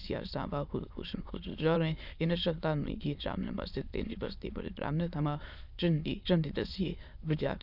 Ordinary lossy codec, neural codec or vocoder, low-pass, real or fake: none; autoencoder, 22.05 kHz, a latent of 192 numbers a frame, VITS, trained on many speakers; 5.4 kHz; fake